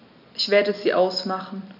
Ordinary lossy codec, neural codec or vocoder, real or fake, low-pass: none; none; real; 5.4 kHz